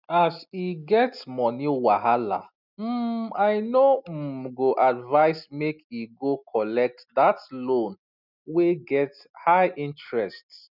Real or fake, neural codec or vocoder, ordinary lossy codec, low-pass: real; none; none; 5.4 kHz